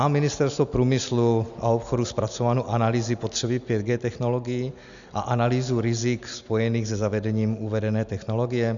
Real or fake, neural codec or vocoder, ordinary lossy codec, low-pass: real; none; MP3, 96 kbps; 7.2 kHz